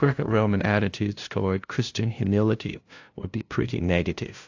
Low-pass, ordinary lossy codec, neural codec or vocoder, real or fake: 7.2 kHz; AAC, 48 kbps; codec, 16 kHz, 0.5 kbps, FunCodec, trained on LibriTTS, 25 frames a second; fake